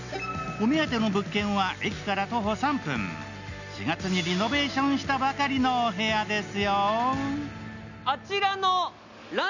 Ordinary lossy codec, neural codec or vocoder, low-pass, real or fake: none; none; 7.2 kHz; real